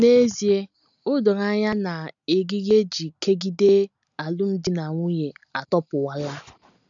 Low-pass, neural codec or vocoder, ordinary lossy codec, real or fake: 7.2 kHz; none; none; real